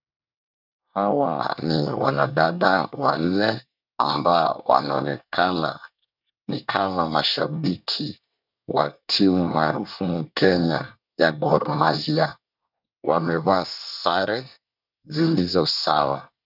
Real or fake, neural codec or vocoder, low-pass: fake; codec, 24 kHz, 1 kbps, SNAC; 5.4 kHz